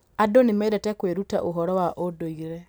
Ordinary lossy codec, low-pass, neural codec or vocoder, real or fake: none; none; none; real